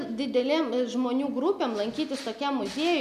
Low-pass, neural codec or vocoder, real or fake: 14.4 kHz; none; real